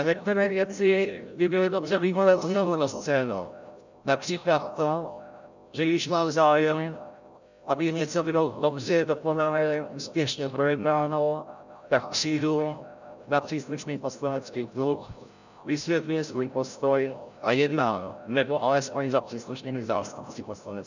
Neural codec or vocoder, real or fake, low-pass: codec, 16 kHz, 0.5 kbps, FreqCodec, larger model; fake; 7.2 kHz